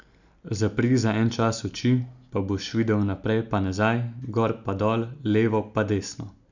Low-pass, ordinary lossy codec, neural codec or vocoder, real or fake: 7.2 kHz; none; none; real